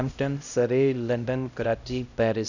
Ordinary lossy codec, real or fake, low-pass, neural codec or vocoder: none; fake; 7.2 kHz; codec, 16 kHz, 0.5 kbps, X-Codec, HuBERT features, trained on LibriSpeech